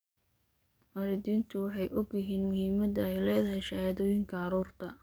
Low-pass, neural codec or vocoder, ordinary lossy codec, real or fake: none; codec, 44.1 kHz, 7.8 kbps, DAC; none; fake